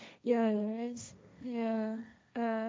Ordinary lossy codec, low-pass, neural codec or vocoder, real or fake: none; none; codec, 16 kHz, 1.1 kbps, Voila-Tokenizer; fake